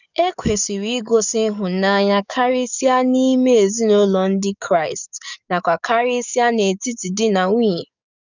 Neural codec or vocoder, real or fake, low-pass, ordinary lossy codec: codec, 44.1 kHz, 7.8 kbps, DAC; fake; 7.2 kHz; none